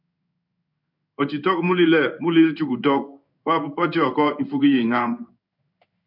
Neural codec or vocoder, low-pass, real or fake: codec, 16 kHz in and 24 kHz out, 1 kbps, XY-Tokenizer; 5.4 kHz; fake